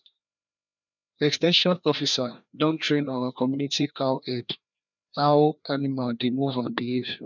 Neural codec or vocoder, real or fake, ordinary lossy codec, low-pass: codec, 16 kHz, 1 kbps, FreqCodec, larger model; fake; none; 7.2 kHz